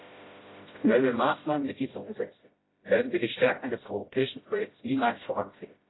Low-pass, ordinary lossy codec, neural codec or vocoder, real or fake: 7.2 kHz; AAC, 16 kbps; codec, 16 kHz, 0.5 kbps, FreqCodec, smaller model; fake